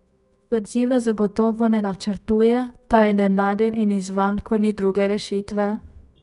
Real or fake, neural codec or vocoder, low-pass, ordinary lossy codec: fake; codec, 24 kHz, 0.9 kbps, WavTokenizer, medium music audio release; 10.8 kHz; none